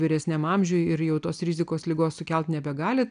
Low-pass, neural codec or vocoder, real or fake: 9.9 kHz; none; real